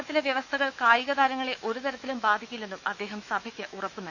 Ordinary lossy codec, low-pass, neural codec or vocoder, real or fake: none; 7.2 kHz; autoencoder, 48 kHz, 128 numbers a frame, DAC-VAE, trained on Japanese speech; fake